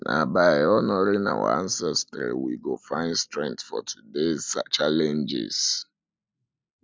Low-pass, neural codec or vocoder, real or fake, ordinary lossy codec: none; none; real; none